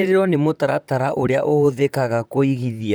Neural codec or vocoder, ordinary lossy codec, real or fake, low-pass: vocoder, 44.1 kHz, 128 mel bands, Pupu-Vocoder; none; fake; none